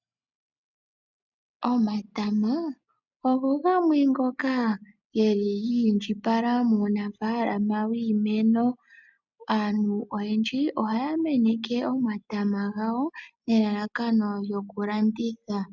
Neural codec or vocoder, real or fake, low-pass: none; real; 7.2 kHz